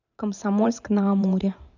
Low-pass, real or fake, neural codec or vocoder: 7.2 kHz; fake; vocoder, 44.1 kHz, 128 mel bands every 512 samples, BigVGAN v2